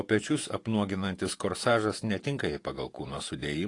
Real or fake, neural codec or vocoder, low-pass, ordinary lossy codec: fake; vocoder, 48 kHz, 128 mel bands, Vocos; 10.8 kHz; AAC, 48 kbps